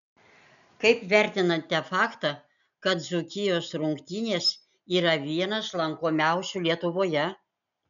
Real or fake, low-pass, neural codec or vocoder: real; 7.2 kHz; none